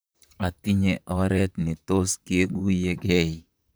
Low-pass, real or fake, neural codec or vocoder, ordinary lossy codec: none; fake; vocoder, 44.1 kHz, 128 mel bands, Pupu-Vocoder; none